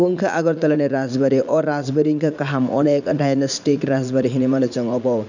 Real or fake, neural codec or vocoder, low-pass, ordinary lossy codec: fake; vocoder, 44.1 kHz, 80 mel bands, Vocos; 7.2 kHz; none